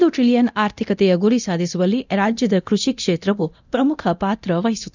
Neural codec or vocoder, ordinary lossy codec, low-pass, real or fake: codec, 24 kHz, 0.9 kbps, DualCodec; none; 7.2 kHz; fake